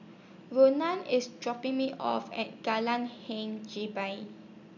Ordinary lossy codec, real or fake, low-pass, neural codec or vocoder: none; real; 7.2 kHz; none